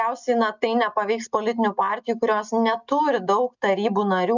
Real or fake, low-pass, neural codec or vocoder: real; 7.2 kHz; none